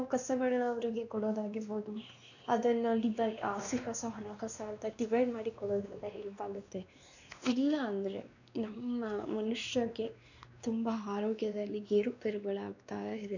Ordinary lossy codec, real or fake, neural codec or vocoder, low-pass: none; fake; codec, 16 kHz, 2 kbps, X-Codec, WavLM features, trained on Multilingual LibriSpeech; 7.2 kHz